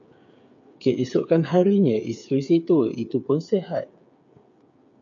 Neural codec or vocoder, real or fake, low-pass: codec, 16 kHz, 8 kbps, FreqCodec, smaller model; fake; 7.2 kHz